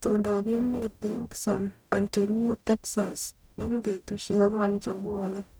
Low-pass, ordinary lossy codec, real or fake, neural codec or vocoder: none; none; fake; codec, 44.1 kHz, 0.9 kbps, DAC